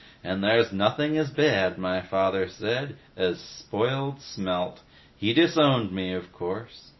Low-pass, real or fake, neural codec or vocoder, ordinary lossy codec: 7.2 kHz; real; none; MP3, 24 kbps